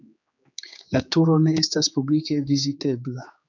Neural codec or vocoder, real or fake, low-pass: codec, 16 kHz, 4 kbps, X-Codec, HuBERT features, trained on general audio; fake; 7.2 kHz